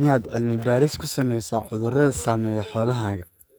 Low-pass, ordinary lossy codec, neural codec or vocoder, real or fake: none; none; codec, 44.1 kHz, 2.6 kbps, SNAC; fake